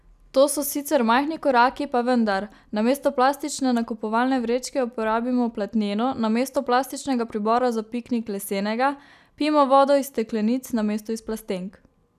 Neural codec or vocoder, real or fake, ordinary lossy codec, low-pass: none; real; none; 14.4 kHz